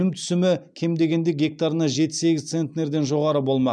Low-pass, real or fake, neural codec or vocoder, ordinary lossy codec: 9.9 kHz; real; none; none